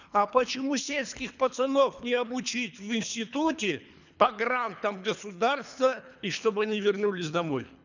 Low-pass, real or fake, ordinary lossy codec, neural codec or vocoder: 7.2 kHz; fake; none; codec, 24 kHz, 3 kbps, HILCodec